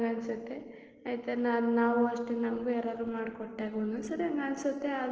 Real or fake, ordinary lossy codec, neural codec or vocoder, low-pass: real; Opus, 16 kbps; none; 7.2 kHz